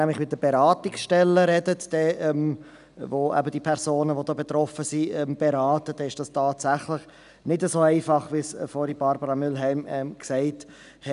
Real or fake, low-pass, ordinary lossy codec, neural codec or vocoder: real; 10.8 kHz; none; none